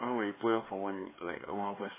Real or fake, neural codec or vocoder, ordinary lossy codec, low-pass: fake; codec, 16 kHz, 4 kbps, X-Codec, HuBERT features, trained on LibriSpeech; MP3, 16 kbps; 3.6 kHz